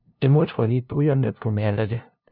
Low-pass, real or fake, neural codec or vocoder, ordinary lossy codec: 5.4 kHz; fake; codec, 16 kHz, 0.5 kbps, FunCodec, trained on LibriTTS, 25 frames a second; none